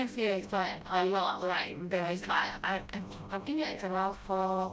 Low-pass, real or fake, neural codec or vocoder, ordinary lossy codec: none; fake; codec, 16 kHz, 0.5 kbps, FreqCodec, smaller model; none